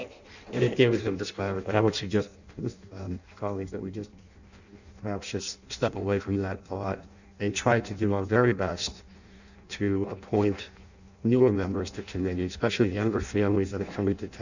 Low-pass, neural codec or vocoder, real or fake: 7.2 kHz; codec, 16 kHz in and 24 kHz out, 0.6 kbps, FireRedTTS-2 codec; fake